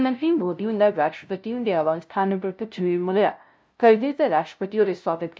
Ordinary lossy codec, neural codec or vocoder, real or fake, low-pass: none; codec, 16 kHz, 0.5 kbps, FunCodec, trained on LibriTTS, 25 frames a second; fake; none